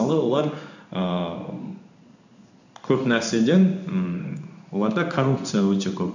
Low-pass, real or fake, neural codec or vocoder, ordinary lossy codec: 7.2 kHz; fake; codec, 16 kHz in and 24 kHz out, 1 kbps, XY-Tokenizer; none